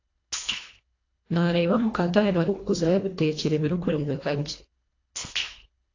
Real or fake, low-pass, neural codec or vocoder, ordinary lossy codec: fake; 7.2 kHz; codec, 24 kHz, 1.5 kbps, HILCodec; AAC, 32 kbps